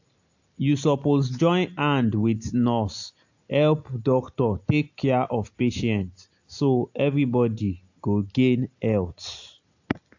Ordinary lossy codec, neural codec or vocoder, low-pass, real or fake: AAC, 48 kbps; none; 7.2 kHz; real